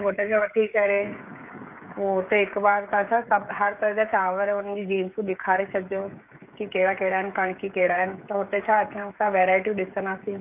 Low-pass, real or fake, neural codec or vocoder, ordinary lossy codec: 3.6 kHz; fake; codec, 16 kHz, 16 kbps, FreqCodec, smaller model; AAC, 32 kbps